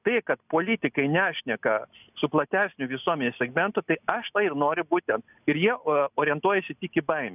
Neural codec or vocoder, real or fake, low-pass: none; real; 3.6 kHz